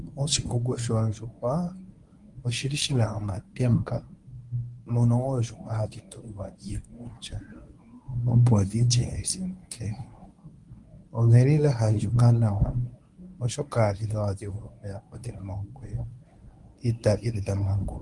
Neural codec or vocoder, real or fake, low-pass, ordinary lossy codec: codec, 24 kHz, 0.9 kbps, WavTokenizer, medium speech release version 1; fake; 10.8 kHz; Opus, 32 kbps